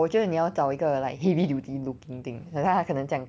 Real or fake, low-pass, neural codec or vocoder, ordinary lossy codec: real; none; none; none